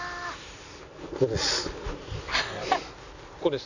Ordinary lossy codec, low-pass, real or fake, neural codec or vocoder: none; 7.2 kHz; real; none